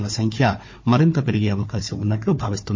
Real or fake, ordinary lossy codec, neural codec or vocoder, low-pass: fake; MP3, 32 kbps; codec, 16 kHz, 4 kbps, FunCodec, trained on Chinese and English, 50 frames a second; 7.2 kHz